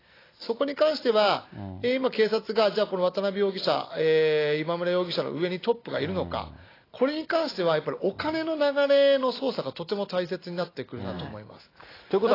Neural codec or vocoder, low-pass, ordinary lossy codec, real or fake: none; 5.4 kHz; AAC, 24 kbps; real